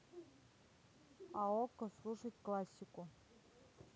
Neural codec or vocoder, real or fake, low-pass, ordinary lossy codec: none; real; none; none